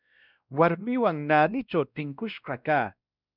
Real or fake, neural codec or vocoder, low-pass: fake; codec, 16 kHz, 1 kbps, X-Codec, WavLM features, trained on Multilingual LibriSpeech; 5.4 kHz